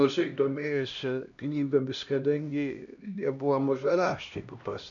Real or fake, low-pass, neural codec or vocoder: fake; 7.2 kHz; codec, 16 kHz, 1 kbps, X-Codec, HuBERT features, trained on LibriSpeech